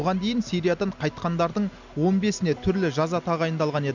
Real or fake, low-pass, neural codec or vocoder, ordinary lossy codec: real; 7.2 kHz; none; none